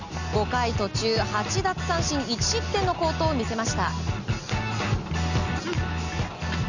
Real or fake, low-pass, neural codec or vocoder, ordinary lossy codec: real; 7.2 kHz; none; none